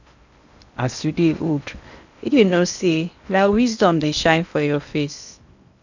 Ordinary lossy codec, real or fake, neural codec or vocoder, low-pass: none; fake; codec, 16 kHz in and 24 kHz out, 0.8 kbps, FocalCodec, streaming, 65536 codes; 7.2 kHz